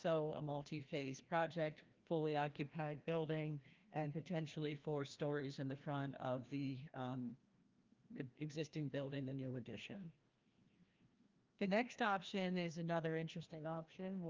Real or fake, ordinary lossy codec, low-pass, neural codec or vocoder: fake; Opus, 32 kbps; 7.2 kHz; codec, 16 kHz, 1 kbps, FreqCodec, larger model